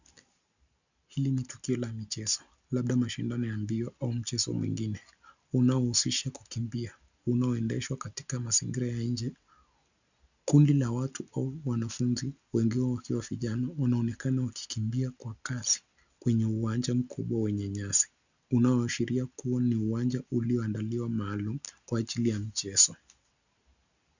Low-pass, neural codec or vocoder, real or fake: 7.2 kHz; none; real